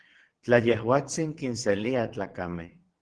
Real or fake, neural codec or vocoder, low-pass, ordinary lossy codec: fake; vocoder, 22.05 kHz, 80 mel bands, WaveNeXt; 9.9 kHz; Opus, 16 kbps